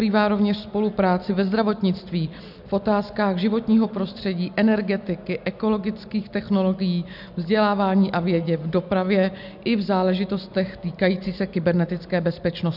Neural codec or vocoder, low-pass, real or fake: none; 5.4 kHz; real